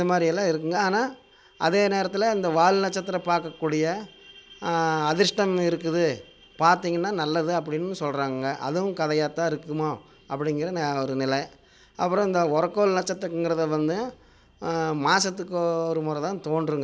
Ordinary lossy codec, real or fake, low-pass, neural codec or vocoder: none; real; none; none